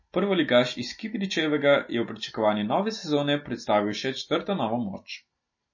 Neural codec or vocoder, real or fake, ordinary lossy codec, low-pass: none; real; MP3, 32 kbps; 7.2 kHz